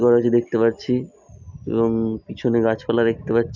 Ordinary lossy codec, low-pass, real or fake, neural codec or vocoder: none; 7.2 kHz; real; none